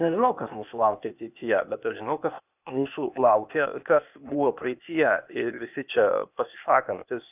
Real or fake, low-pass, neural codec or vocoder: fake; 3.6 kHz; codec, 16 kHz, 0.8 kbps, ZipCodec